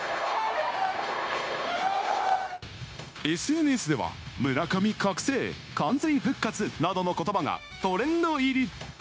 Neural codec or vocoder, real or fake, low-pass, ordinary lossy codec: codec, 16 kHz, 0.9 kbps, LongCat-Audio-Codec; fake; none; none